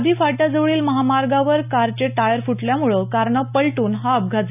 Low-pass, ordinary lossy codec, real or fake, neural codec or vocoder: 3.6 kHz; none; real; none